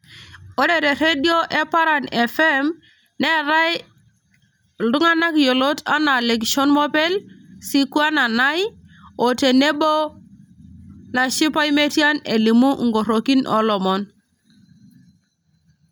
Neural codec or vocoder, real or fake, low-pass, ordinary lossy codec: none; real; none; none